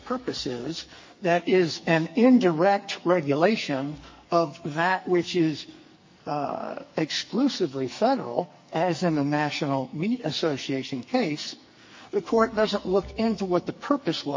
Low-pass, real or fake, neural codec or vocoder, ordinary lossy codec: 7.2 kHz; fake; codec, 44.1 kHz, 2.6 kbps, SNAC; MP3, 32 kbps